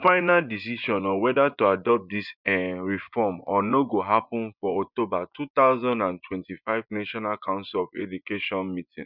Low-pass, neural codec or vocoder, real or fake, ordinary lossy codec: 5.4 kHz; vocoder, 24 kHz, 100 mel bands, Vocos; fake; none